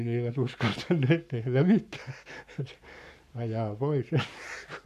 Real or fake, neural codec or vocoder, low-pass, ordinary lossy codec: fake; codec, 44.1 kHz, 7.8 kbps, Pupu-Codec; 14.4 kHz; none